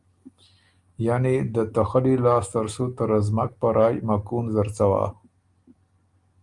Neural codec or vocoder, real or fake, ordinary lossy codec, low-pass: none; real; Opus, 32 kbps; 10.8 kHz